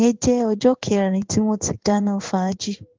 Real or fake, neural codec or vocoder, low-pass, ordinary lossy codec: fake; codec, 16 kHz in and 24 kHz out, 1 kbps, XY-Tokenizer; 7.2 kHz; Opus, 16 kbps